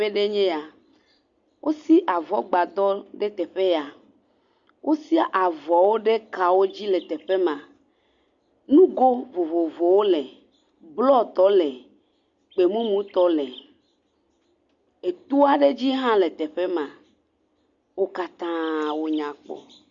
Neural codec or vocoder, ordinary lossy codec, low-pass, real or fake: none; Opus, 64 kbps; 7.2 kHz; real